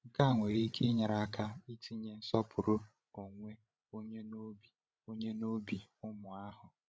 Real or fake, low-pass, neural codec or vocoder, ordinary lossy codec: fake; none; codec, 16 kHz, 16 kbps, FreqCodec, larger model; none